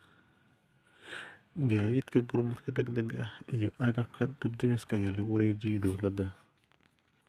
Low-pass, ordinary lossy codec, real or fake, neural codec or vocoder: 14.4 kHz; Opus, 64 kbps; fake; codec, 32 kHz, 1.9 kbps, SNAC